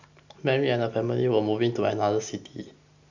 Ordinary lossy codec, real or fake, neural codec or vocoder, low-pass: none; real; none; 7.2 kHz